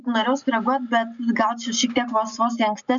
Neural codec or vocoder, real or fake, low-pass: none; real; 7.2 kHz